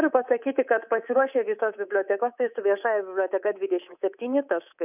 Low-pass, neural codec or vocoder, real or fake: 3.6 kHz; codec, 24 kHz, 3.1 kbps, DualCodec; fake